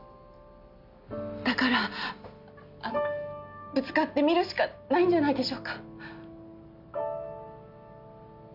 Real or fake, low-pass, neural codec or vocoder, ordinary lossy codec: real; 5.4 kHz; none; none